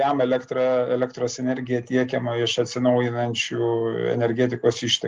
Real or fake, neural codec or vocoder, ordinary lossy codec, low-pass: real; none; Opus, 32 kbps; 7.2 kHz